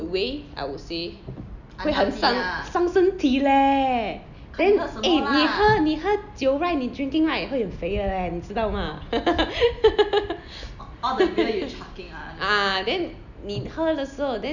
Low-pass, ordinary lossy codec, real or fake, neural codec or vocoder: 7.2 kHz; none; real; none